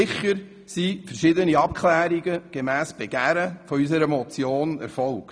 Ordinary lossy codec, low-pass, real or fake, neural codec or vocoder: none; none; real; none